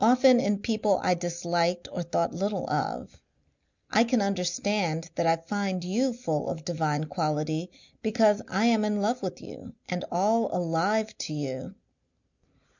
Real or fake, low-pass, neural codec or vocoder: real; 7.2 kHz; none